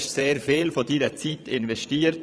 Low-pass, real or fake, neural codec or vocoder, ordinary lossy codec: none; fake; vocoder, 22.05 kHz, 80 mel bands, Vocos; none